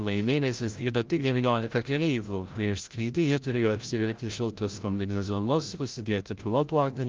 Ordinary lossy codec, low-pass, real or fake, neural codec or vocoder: Opus, 24 kbps; 7.2 kHz; fake; codec, 16 kHz, 0.5 kbps, FreqCodec, larger model